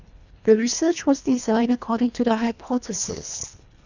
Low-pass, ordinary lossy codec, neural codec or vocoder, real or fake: 7.2 kHz; none; codec, 24 kHz, 1.5 kbps, HILCodec; fake